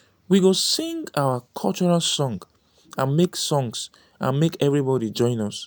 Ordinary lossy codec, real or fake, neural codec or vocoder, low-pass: none; real; none; none